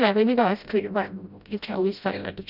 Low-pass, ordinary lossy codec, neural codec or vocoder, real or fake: 5.4 kHz; none; codec, 16 kHz, 0.5 kbps, FreqCodec, smaller model; fake